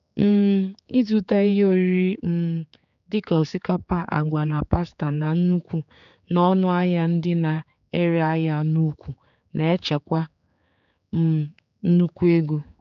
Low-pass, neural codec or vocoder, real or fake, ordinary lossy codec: 7.2 kHz; codec, 16 kHz, 4 kbps, X-Codec, HuBERT features, trained on general audio; fake; none